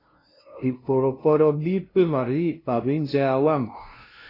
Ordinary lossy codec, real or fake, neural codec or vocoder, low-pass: AAC, 24 kbps; fake; codec, 16 kHz, 0.5 kbps, FunCodec, trained on LibriTTS, 25 frames a second; 5.4 kHz